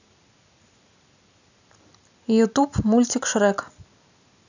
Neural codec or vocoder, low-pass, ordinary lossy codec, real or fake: none; 7.2 kHz; none; real